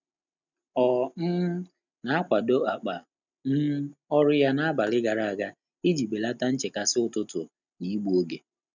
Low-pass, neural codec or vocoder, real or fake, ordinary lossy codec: 7.2 kHz; none; real; none